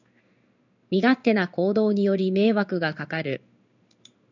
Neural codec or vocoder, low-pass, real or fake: codec, 16 kHz in and 24 kHz out, 1 kbps, XY-Tokenizer; 7.2 kHz; fake